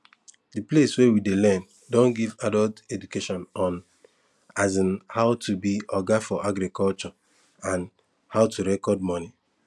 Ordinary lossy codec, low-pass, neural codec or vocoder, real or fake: none; none; none; real